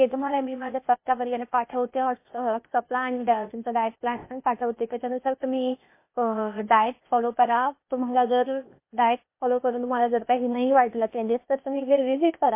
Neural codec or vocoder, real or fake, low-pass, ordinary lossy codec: codec, 16 kHz, 0.8 kbps, ZipCodec; fake; 3.6 kHz; MP3, 24 kbps